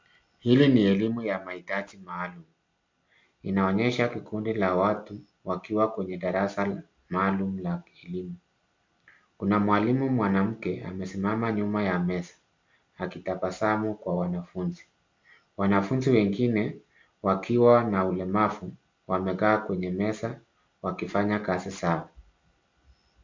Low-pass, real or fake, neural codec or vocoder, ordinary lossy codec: 7.2 kHz; real; none; MP3, 64 kbps